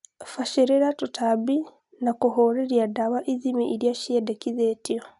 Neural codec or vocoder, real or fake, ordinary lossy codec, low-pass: none; real; none; 10.8 kHz